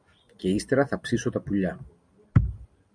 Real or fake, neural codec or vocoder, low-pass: real; none; 9.9 kHz